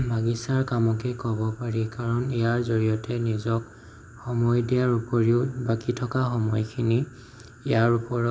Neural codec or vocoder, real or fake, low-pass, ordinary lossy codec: none; real; none; none